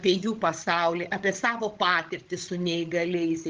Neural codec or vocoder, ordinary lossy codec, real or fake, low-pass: codec, 16 kHz, 16 kbps, FreqCodec, larger model; Opus, 16 kbps; fake; 7.2 kHz